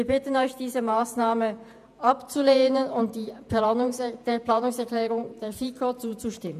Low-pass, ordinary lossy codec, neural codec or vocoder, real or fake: 14.4 kHz; none; vocoder, 48 kHz, 128 mel bands, Vocos; fake